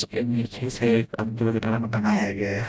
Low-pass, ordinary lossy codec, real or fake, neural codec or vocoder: none; none; fake; codec, 16 kHz, 0.5 kbps, FreqCodec, smaller model